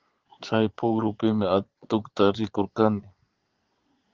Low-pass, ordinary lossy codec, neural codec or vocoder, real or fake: 7.2 kHz; Opus, 16 kbps; vocoder, 22.05 kHz, 80 mel bands, Vocos; fake